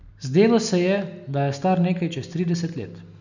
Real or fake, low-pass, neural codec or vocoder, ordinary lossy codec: real; 7.2 kHz; none; none